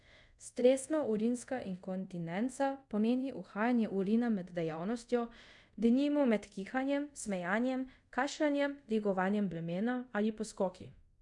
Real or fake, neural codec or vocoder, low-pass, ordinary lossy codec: fake; codec, 24 kHz, 0.5 kbps, DualCodec; 10.8 kHz; none